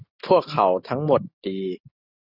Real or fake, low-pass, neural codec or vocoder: real; 5.4 kHz; none